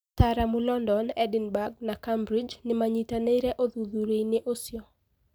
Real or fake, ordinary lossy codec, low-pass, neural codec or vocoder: real; none; none; none